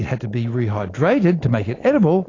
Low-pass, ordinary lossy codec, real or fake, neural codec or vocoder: 7.2 kHz; AAC, 32 kbps; real; none